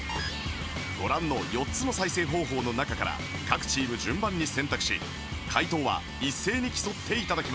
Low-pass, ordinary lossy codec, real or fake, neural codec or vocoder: none; none; real; none